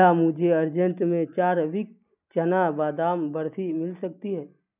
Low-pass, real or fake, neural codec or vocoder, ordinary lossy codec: 3.6 kHz; real; none; none